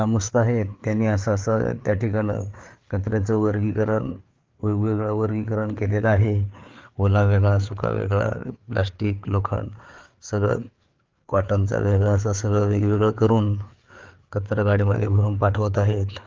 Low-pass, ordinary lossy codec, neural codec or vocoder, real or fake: 7.2 kHz; Opus, 32 kbps; codec, 16 kHz, 4 kbps, FreqCodec, larger model; fake